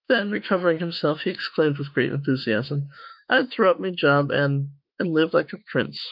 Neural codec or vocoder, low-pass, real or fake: autoencoder, 48 kHz, 32 numbers a frame, DAC-VAE, trained on Japanese speech; 5.4 kHz; fake